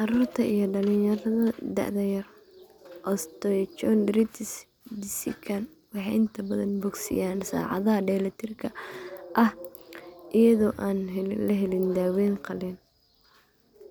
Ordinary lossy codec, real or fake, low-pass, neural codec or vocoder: none; real; none; none